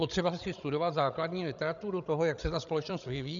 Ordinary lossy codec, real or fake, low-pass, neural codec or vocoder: MP3, 96 kbps; fake; 7.2 kHz; codec, 16 kHz, 16 kbps, FreqCodec, larger model